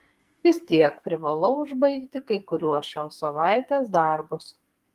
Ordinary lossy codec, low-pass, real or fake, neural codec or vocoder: Opus, 32 kbps; 14.4 kHz; fake; codec, 44.1 kHz, 2.6 kbps, SNAC